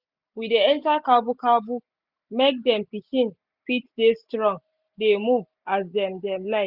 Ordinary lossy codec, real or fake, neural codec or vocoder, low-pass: Opus, 64 kbps; real; none; 5.4 kHz